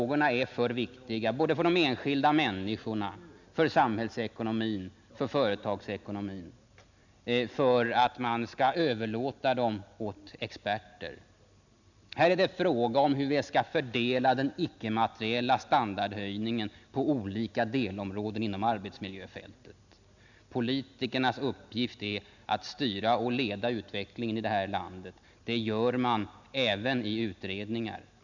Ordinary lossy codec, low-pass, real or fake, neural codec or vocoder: none; 7.2 kHz; real; none